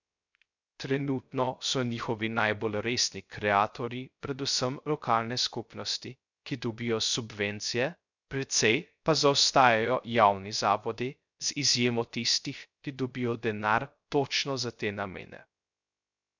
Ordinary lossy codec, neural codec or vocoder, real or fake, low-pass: none; codec, 16 kHz, 0.3 kbps, FocalCodec; fake; 7.2 kHz